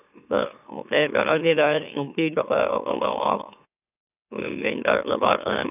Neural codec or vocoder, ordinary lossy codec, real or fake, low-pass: autoencoder, 44.1 kHz, a latent of 192 numbers a frame, MeloTTS; none; fake; 3.6 kHz